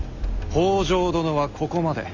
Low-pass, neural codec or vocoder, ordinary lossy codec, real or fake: 7.2 kHz; none; none; real